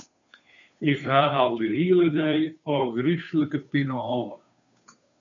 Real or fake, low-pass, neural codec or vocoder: fake; 7.2 kHz; codec, 16 kHz, 2 kbps, FunCodec, trained on Chinese and English, 25 frames a second